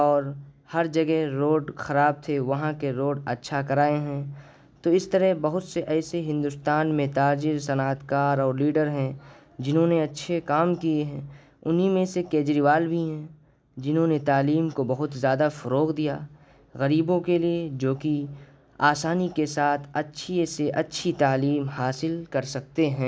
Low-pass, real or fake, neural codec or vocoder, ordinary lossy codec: none; real; none; none